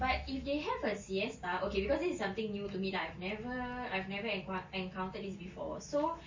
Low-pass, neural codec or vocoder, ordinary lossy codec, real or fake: 7.2 kHz; none; MP3, 32 kbps; real